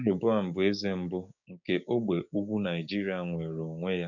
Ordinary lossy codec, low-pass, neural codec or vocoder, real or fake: none; 7.2 kHz; codec, 16 kHz, 6 kbps, DAC; fake